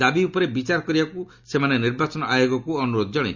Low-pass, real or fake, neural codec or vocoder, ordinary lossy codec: 7.2 kHz; real; none; Opus, 64 kbps